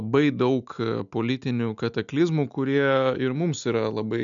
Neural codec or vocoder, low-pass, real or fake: none; 7.2 kHz; real